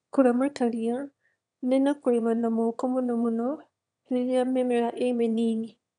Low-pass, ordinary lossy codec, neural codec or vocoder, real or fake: 9.9 kHz; none; autoencoder, 22.05 kHz, a latent of 192 numbers a frame, VITS, trained on one speaker; fake